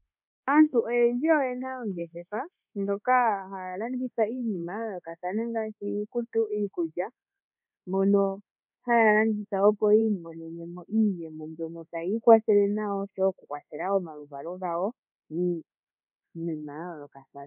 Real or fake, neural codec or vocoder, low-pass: fake; codec, 24 kHz, 1.2 kbps, DualCodec; 3.6 kHz